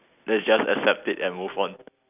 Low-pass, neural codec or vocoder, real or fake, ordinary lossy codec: 3.6 kHz; none; real; none